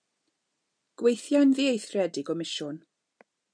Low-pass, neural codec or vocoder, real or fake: 9.9 kHz; none; real